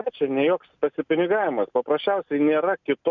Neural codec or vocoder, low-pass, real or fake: none; 7.2 kHz; real